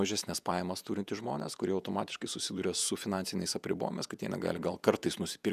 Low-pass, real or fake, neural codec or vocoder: 14.4 kHz; fake; vocoder, 48 kHz, 128 mel bands, Vocos